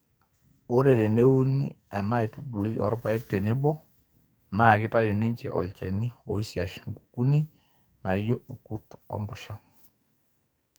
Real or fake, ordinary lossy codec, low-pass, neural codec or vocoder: fake; none; none; codec, 44.1 kHz, 2.6 kbps, SNAC